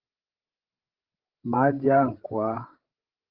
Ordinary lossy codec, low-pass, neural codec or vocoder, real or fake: Opus, 16 kbps; 5.4 kHz; codec, 16 kHz, 16 kbps, FreqCodec, larger model; fake